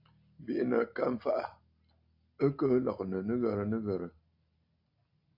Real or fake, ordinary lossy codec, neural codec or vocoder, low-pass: real; AAC, 48 kbps; none; 5.4 kHz